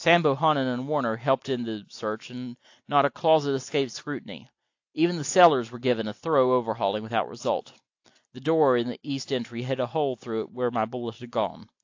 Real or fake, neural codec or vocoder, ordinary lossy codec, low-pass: real; none; AAC, 48 kbps; 7.2 kHz